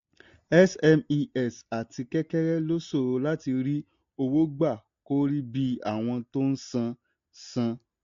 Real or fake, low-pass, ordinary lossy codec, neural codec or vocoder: real; 7.2 kHz; AAC, 48 kbps; none